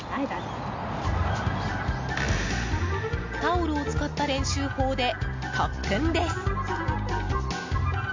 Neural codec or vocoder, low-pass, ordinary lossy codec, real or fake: none; 7.2 kHz; MP3, 64 kbps; real